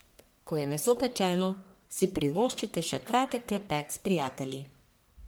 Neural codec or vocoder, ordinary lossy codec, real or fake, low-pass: codec, 44.1 kHz, 1.7 kbps, Pupu-Codec; none; fake; none